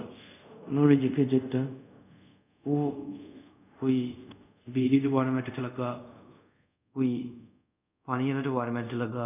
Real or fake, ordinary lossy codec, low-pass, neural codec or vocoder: fake; none; 3.6 kHz; codec, 24 kHz, 0.5 kbps, DualCodec